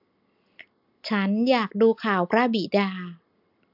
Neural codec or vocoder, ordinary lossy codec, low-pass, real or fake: none; AAC, 48 kbps; 5.4 kHz; real